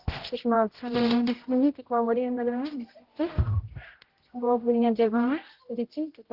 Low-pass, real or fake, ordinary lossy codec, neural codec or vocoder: 5.4 kHz; fake; Opus, 16 kbps; codec, 16 kHz, 0.5 kbps, X-Codec, HuBERT features, trained on general audio